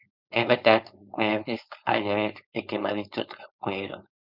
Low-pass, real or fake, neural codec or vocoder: 5.4 kHz; fake; codec, 16 kHz, 4.8 kbps, FACodec